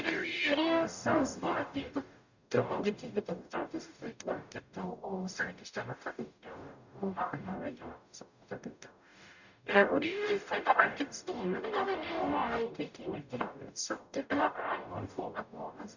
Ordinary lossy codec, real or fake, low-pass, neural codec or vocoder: none; fake; 7.2 kHz; codec, 44.1 kHz, 0.9 kbps, DAC